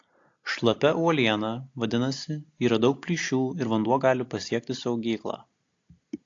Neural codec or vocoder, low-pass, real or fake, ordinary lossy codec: none; 7.2 kHz; real; AAC, 48 kbps